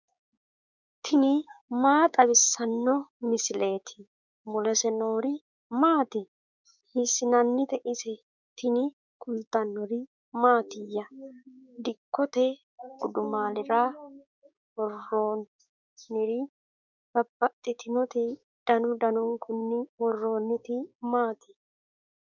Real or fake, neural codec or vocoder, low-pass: fake; codec, 16 kHz, 6 kbps, DAC; 7.2 kHz